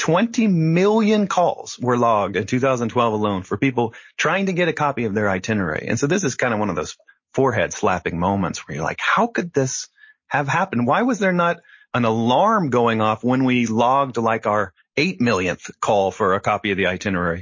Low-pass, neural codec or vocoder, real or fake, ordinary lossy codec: 7.2 kHz; none; real; MP3, 32 kbps